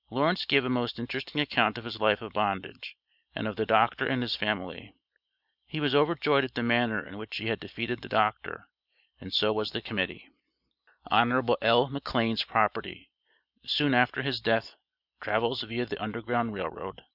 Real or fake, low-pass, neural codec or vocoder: real; 5.4 kHz; none